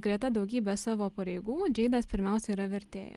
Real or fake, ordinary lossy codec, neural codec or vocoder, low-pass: real; Opus, 24 kbps; none; 10.8 kHz